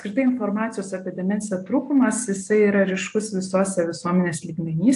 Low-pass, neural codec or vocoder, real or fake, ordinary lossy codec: 10.8 kHz; none; real; AAC, 64 kbps